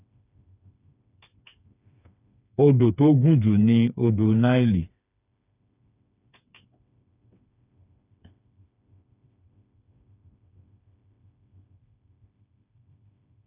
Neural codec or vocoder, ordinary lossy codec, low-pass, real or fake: codec, 16 kHz, 4 kbps, FreqCodec, smaller model; none; 3.6 kHz; fake